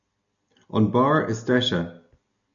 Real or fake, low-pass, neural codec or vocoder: real; 7.2 kHz; none